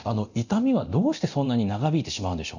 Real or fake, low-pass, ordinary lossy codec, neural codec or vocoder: fake; 7.2 kHz; none; codec, 24 kHz, 0.9 kbps, DualCodec